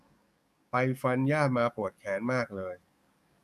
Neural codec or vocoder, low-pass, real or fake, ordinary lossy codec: codec, 44.1 kHz, 7.8 kbps, DAC; 14.4 kHz; fake; none